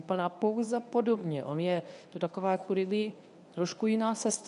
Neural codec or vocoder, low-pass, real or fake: codec, 24 kHz, 0.9 kbps, WavTokenizer, medium speech release version 1; 10.8 kHz; fake